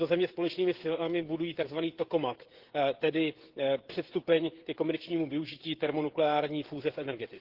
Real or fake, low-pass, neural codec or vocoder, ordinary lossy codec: fake; 5.4 kHz; codec, 16 kHz, 16 kbps, FreqCodec, smaller model; Opus, 16 kbps